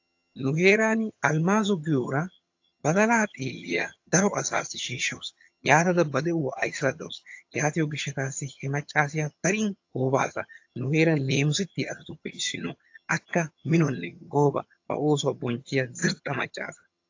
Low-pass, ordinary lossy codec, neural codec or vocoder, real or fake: 7.2 kHz; AAC, 48 kbps; vocoder, 22.05 kHz, 80 mel bands, HiFi-GAN; fake